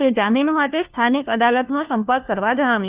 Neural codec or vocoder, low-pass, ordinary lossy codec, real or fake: codec, 16 kHz, 1 kbps, FunCodec, trained on LibriTTS, 50 frames a second; 3.6 kHz; Opus, 32 kbps; fake